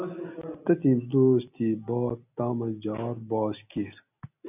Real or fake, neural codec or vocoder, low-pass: real; none; 3.6 kHz